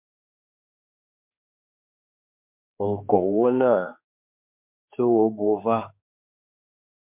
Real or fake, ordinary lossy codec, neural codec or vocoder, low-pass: fake; MP3, 32 kbps; codec, 16 kHz, 2 kbps, X-Codec, HuBERT features, trained on general audio; 3.6 kHz